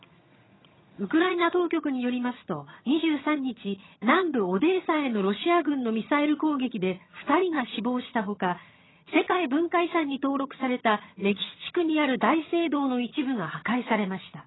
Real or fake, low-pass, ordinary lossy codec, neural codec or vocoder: fake; 7.2 kHz; AAC, 16 kbps; vocoder, 22.05 kHz, 80 mel bands, HiFi-GAN